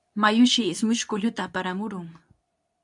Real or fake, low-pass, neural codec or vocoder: fake; 10.8 kHz; codec, 24 kHz, 0.9 kbps, WavTokenizer, medium speech release version 2